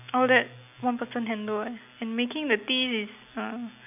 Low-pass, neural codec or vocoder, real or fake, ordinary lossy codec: 3.6 kHz; none; real; none